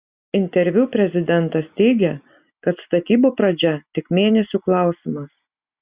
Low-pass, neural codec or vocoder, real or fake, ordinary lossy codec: 3.6 kHz; none; real; Opus, 64 kbps